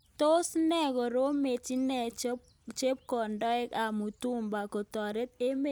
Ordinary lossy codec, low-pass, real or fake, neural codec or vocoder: none; none; real; none